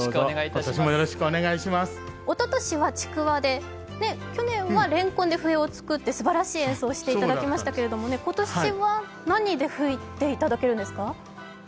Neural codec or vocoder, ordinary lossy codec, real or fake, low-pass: none; none; real; none